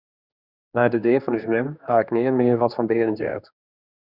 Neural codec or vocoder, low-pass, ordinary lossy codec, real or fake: codec, 16 kHz, 2 kbps, X-Codec, HuBERT features, trained on general audio; 5.4 kHz; Opus, 64 kbps; fake